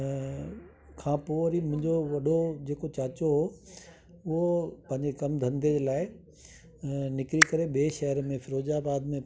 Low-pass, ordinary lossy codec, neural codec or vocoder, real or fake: none; none; none; real